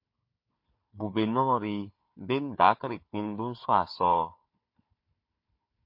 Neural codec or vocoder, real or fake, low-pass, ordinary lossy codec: codec, 16 kHz, 4 kbps, FunCodec, trained on Chinese and English, 50 frames a second; fake; 5.4 kHz; MP3, 32 kbps